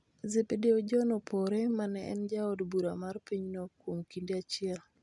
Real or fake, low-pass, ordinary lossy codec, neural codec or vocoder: real; 10.8 kHz; none; none